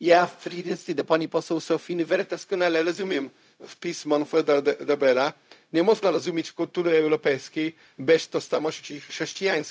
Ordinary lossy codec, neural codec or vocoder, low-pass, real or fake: none; codec, 16 kHz, 0.4 kbps, LongCat-Audio-Codec; none; fake